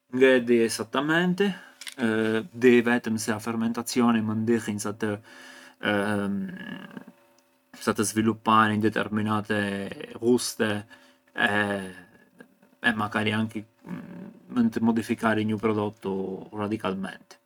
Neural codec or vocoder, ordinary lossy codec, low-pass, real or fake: none; none; 19.8 kHz; real